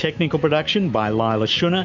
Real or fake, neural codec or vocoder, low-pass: fake; autoencoder, 48 kHz, 128 numbers a frame, DAC-VAE, trained on Japanese speech; 7.2 kHz